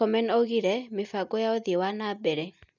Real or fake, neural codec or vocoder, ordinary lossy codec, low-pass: real; none; none; 7.2 kHz